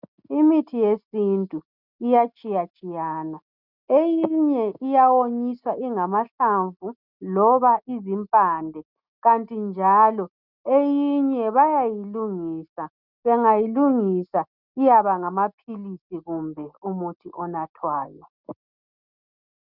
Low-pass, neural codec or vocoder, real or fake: 5.4 kHz; none; real